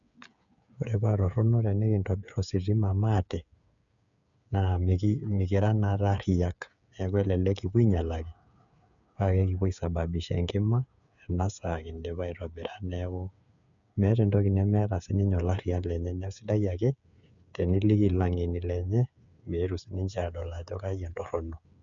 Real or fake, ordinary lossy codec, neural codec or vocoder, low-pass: fake; none; codec, 16 kHz, 8 kbps, FreqCodec, smaller model; 7.2 kHz